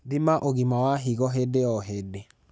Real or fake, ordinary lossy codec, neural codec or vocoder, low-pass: real; none; none; none